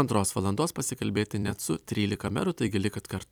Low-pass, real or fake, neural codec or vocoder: 19.8 kHz; fake; vocoder, 44.1 kHz, 128 mel bands every 256 samples, BigVGAN v2